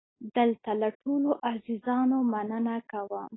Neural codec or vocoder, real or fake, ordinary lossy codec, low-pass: none; real; AAC, 16 kbps; 7.2 kHz